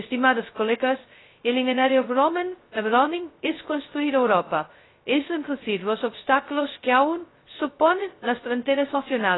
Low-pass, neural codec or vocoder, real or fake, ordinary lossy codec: 7.2 kHz; codec, 16 kHz, 0.2 kbps, FocalCodec; fake; AAC, 16 kbps